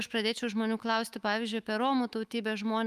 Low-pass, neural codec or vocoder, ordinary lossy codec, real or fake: 14.4 kHz; autoencoder, 48 kHz, 128 numbers a frame, DAC-VAE, trained on Japanese speech; Opus, 32 kbps; fake